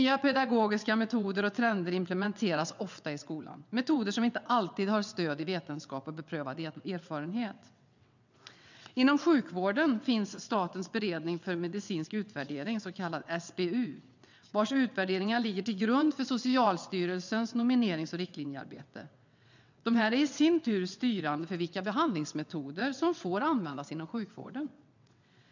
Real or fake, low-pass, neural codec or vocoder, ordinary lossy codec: fake; 7.2 kHz; vocoder, 22.05 kHz, 80 mel bands, WaveNeXt; none